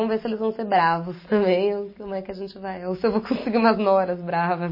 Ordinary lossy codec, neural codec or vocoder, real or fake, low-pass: MP3, 24 kbps; none; real; 5.4 kHz